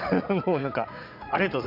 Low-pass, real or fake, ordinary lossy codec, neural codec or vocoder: 5.4 kHz; fake; none; vocoder, 44.1 kHz, 80 mel bands, Vocos